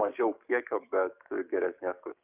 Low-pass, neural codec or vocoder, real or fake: 3.6 kHz; codec, 44.1 kHz, 7.8 kbps, DAC; fake